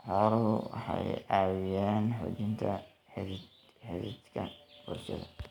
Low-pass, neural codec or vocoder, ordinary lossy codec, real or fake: 19.8 kHz; none; none; real